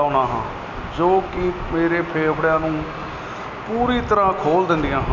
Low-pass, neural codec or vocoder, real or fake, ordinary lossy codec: 7.2 kHz; none; real; none